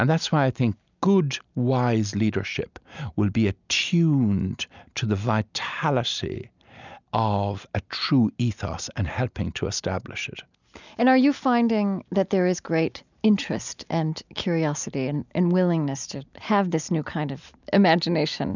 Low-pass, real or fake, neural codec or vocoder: 7.2 kHz; real; none